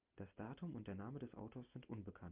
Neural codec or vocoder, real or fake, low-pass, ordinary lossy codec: none; real; 3.6 kHz; Opus, 24 kbps